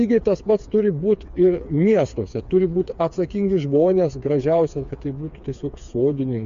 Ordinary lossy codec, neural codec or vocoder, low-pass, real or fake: AAC, 64 kbps; codec, 16 kHz, 4 kbps, FreqCodec, smaller model; 7.2 kHz; fake